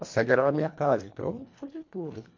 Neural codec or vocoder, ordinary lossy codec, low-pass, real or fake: codec, 24 kHz, 1.5 kbps, HILCodec; MP3, 48 kbps; 7.2 kHz; fake